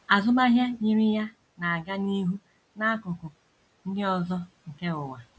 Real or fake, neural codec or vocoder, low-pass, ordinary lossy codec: real; none; none; none